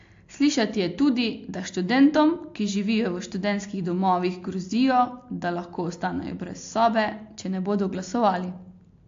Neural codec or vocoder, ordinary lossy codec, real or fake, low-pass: none; AAC, 48 kbps; real; 7.2 kHz